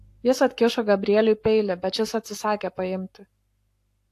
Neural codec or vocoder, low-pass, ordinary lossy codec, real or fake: codec, 44.1 kHz, 7.8 kbps, Pupu-Codec; 14.4 kHz; AAC, 64 kbps; fake